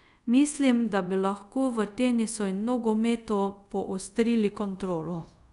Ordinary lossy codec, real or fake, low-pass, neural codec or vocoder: none; fake; 10.8 kHz; codec, 24 kHz, 0.5 kbps, DualCodec